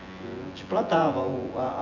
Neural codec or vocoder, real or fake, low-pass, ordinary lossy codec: vocoder, 24 kHz, 100 mel bands, Vocos; fake; 7.2 kHz; none